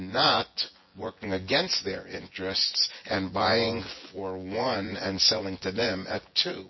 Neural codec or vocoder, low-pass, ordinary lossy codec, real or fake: vocoder, 24 kHz, 100 mel bands, Vocos; 7.2 kHz; MP3, 24 kbps; fake